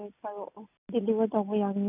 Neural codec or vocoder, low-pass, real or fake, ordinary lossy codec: none; 3.6 kHz; real; none